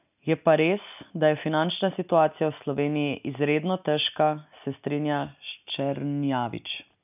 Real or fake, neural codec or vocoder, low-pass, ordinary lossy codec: real; none; 3.6 kHz; none